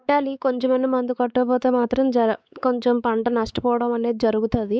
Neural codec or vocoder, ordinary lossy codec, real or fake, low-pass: codec, 16 kHz, 4 kbps, X-Codec, WavLM features, trained on Multilingual LibriSpeech; none; fake; none